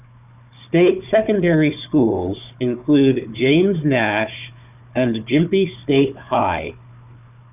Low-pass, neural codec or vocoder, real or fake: 3.6 kHz; codec, 16 kHz, 4 kbps, FunCodec, trained on Chinese and English, 50 frames a second; fake